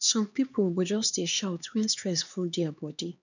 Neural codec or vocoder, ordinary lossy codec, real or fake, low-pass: codec, 16 kHz, 2 kbps, X-Codec, HuBERT features, trained on LibriSpeech; none; fake; 7.2 kHz